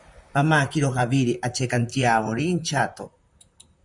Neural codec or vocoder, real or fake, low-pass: vocoder, 44.1 kHz, 128 mel bands, Pupu-Vocoder; fake; 10.8 kHz